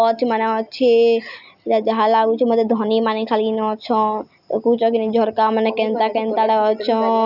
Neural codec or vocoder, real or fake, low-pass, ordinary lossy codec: none; real; 5.4 kHz; none